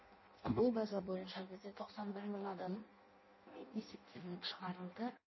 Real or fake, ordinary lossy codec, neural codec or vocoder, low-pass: fake; MP3, 24 kbps; codec, 16 kHz in and 24 kHz out, 0.6 kbps, FireRedTTS-2 codec; 7.2 kHz